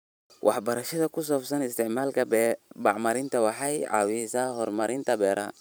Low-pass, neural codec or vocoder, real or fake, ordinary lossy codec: none; vocoder, 44.1 kHz, 128 mel bands every 512 samples, BigVGAN v2; fake; none